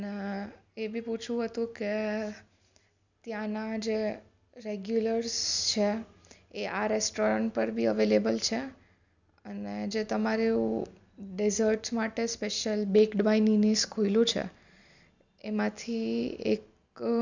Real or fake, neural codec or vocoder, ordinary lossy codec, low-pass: real; none; none; 7.2 kHz